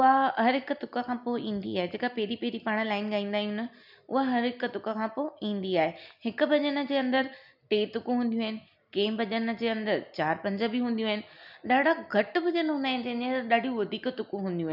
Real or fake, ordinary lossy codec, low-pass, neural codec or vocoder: real; none; 5.4 kHz; none